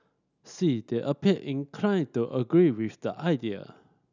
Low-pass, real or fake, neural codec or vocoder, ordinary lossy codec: 7.2 kHz; real; none; none